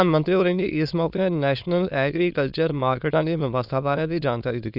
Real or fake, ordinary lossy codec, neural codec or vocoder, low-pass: fake; none; autoencoder, 22.05 kHz, a latent of 192 numbers a frame, VITS, trained on many speakers; 5.4 kHz